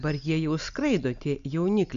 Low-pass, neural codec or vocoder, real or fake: 7.2 kHz; none; real